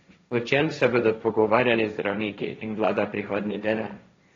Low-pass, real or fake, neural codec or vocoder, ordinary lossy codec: 7.2 kHz; fake; codec, 16 kHz, 1.1 kbps, Voila-Tokenizer; AAC, 32 kbps